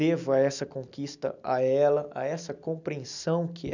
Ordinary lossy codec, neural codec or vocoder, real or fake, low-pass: none; none; real; 7.2 kHz